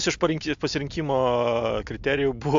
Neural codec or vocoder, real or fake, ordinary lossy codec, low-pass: none; real; AAC, 64 kbps; 7.2 kHz